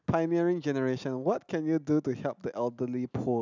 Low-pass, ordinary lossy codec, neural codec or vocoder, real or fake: 7.2 kHz; none; none; real